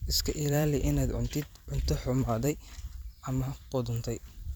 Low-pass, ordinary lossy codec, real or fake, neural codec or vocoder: none; none; fake; vocoder, 44.1 kHz, 128 mel bands every 256 samples, BigVGAN v2